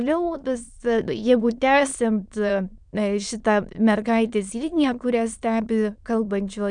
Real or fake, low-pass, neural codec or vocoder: fake; 9.9 kHz; autoencoder, 22.05 kHz, a latent of 192 numbers a frame, VITS, trained on many speakers